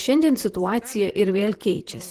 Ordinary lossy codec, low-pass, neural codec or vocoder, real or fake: Opus, 16 kbps; 14.4 kHz; vocoder, 44.1 kHz, 128 mel bands, Pupu-Vocoder; fake